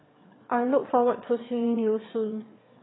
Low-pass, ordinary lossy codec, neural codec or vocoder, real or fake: 7.2 kHz; AAC, 16 kbps; autoencoder, 22.05 kHz, a latent of 192 numbers a frame, VITS, trained on one speaker; fake